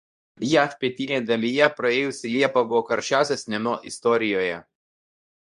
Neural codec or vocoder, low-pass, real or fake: codec, 24 kHz, 0.9 kbps, WavTokenizer, medium speech release version 2; 10.8 kHz; fake